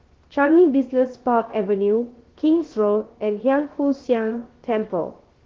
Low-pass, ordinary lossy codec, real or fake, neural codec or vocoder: 7.2 kHz; Opus, 16 kbps; fake; codec, 16 kHz, 0.7 kbps, FocalCodec